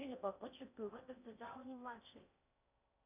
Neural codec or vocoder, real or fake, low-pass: codec, 16 kHz in and 24 kHz out, 0.6 kbps, FocalCodec, streaming, 4096 codes; fake; 3.6 kHz